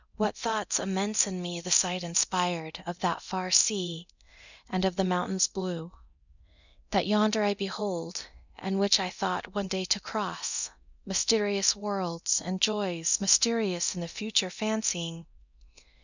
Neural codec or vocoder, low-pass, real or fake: codec, 24 kHz, 0.9 kbps, DualCodec; 7.2 kHz; fake